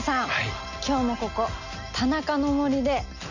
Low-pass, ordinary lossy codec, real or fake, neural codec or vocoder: 7.2 kHz; none; real; none